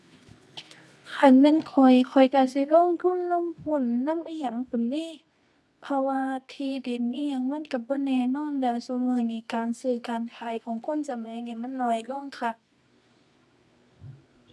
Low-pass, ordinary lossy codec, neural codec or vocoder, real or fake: none; none; codec, 24 kHz, 0.9 kbps, WavTokenizer, medium music audio release; fake